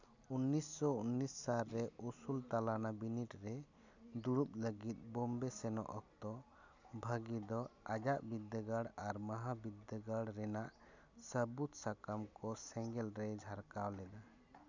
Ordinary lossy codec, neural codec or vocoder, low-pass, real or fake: none; none; 7.2 kHz; real